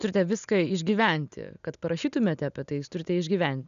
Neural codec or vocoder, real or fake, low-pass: none; real; 7.2 kHz